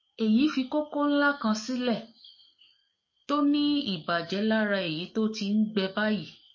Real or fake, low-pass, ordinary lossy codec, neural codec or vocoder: fake; 7.2 kHz; MP3, 32 kbps; codec, 44.1 kHz, 7.8 kbps, DAC